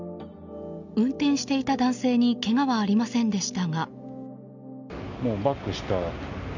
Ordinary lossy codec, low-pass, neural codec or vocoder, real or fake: none; 7.2 kHz; none; real